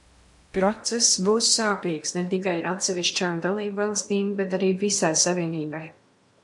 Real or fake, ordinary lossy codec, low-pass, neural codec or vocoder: fake; MP3, 64 kbps; 10.8 kHz; codec, 16 kHz in and 24 kHz out, 0.8 kbps, FocalCodec, streaming, 65536 codes